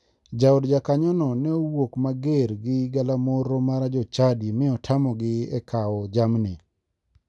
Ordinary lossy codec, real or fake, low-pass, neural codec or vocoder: none; real; none; none